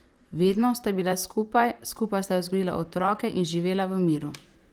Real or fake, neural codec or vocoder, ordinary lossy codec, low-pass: fake; vocoder, 44.1 kHz, 128 mel bands, Pupu-Vocoder; Opus, 32 kbps; 19.8 kHz